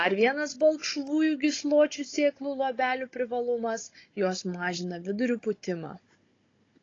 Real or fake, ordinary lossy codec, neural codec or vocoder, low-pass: fake; AAC, 32 kbps; codec, 16 kHz, 16 kbps, FunCodec, trained on Chinese and English, 50 frames a second; 7.2 kHz